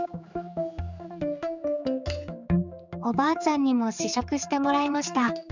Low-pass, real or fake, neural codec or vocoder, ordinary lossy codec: 7.2 kHz; fake; codec, 16 kHz, 4 kbps, X-Codec, HuBERT features, trained on general audio; none